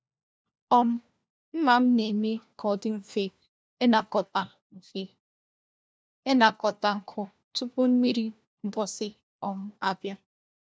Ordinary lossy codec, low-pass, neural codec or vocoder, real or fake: none; none; codec, 16 kHz, 1 kbps, FunCodec, trained on LibriTTS, 50 frames a second; fake